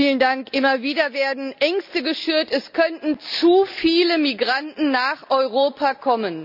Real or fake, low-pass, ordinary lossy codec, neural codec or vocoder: real; 5.4 kHz; none; none